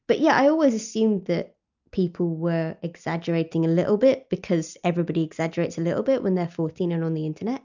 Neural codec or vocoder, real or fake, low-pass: none; real; 7.2 kHz